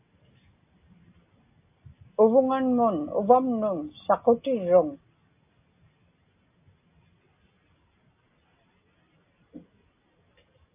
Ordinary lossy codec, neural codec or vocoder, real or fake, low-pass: AAC, 32 kbps; none; real; 3.6 kHz